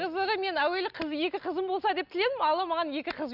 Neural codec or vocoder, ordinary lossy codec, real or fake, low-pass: none; none; real; 5.4 kHz